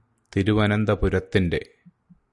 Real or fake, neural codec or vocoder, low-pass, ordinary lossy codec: real; none; 10.8 kHz; Opus, 64 kbps